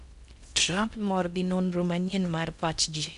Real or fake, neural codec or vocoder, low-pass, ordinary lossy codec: fake; codec, 16 kHz in and 24 kHz out, 0.6 kbps, FocalCodec, streaming, 4096 codes; 10.8 kHz; none